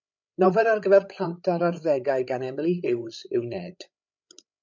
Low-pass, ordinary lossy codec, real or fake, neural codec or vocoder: 7.2 kHz; MP3, 64 kbps; fake; codec, 16 kHz, 8 kbps, FreqCodec, larger model